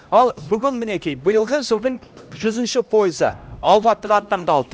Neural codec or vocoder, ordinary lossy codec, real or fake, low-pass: codec, 16 kHz, 1 kbps, X-Codec, HuBERT features, trained on LibriSpeech; none; fake; none